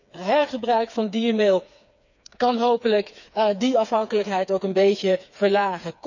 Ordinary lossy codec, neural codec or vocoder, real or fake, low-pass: none; codec, 16 kHz, 4 kbps, FreqCodec, smaller model; fake; 7.2 kHz